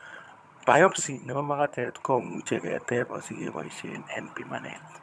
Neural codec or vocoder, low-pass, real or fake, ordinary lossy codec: vocoder, 22.05 kHz, 80 mel bands, HiFi-GAN; none; fake; none